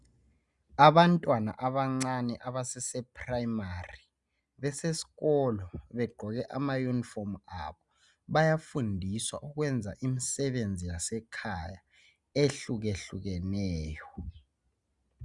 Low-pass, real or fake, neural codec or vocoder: 10.8 kHz; real; none